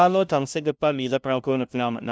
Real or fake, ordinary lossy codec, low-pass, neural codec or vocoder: fake; none; none; codec, 16 kHz, 0.5 kbps, FunCodec, trained on LibriTTS, 25 frames a second